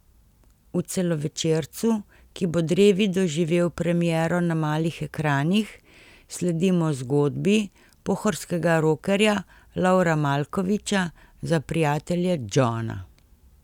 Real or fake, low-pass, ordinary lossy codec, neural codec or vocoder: real; 19.8 kHz; none; none